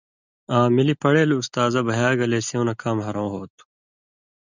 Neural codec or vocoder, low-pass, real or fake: none; 7.2 kHz; real